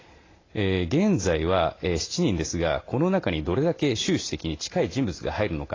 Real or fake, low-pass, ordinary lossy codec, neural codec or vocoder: real; 7.2 kHz; AAC, 32 kbps; none